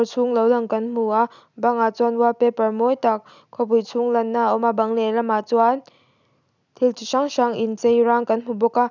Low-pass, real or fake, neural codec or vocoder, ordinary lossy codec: 7.2 kHz; real; none; none